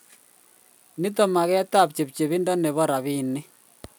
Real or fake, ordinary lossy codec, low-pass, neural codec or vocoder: real; none; none; none